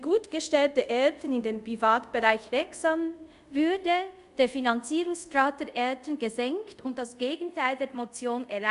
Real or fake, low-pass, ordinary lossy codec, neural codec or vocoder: fake; 10.8 kHz; none; codec, 24 kHz, 0.5 kbps, DualCodec